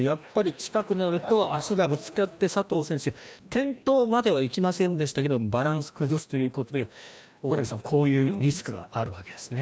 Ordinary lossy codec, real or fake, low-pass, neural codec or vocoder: none; fake; none; codec, 16 kHz, 1 kbps, FreqCodec, larger model